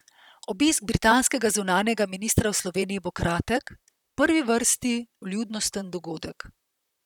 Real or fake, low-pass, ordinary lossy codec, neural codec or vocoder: fake; 19.8 kHz; none; vocoder, 44.1 kHz, 128 mel bands, Pupu-Vocoder